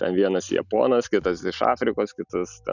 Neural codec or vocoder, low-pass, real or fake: none; 7.2 kHz; real